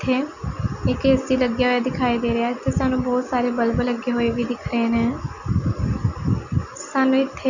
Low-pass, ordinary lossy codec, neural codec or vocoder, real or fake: 7.2 kHz; none; none; real